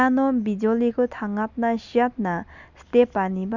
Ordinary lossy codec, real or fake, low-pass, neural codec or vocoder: Opus, 64 kbps; real; 7.2 kHz; none